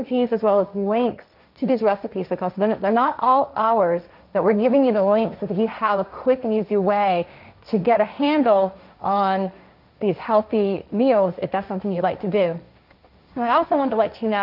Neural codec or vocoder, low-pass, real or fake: codec, 16 kHz, 1.1 kbps, Voila-Tokenizer; 5.4 kHz; fake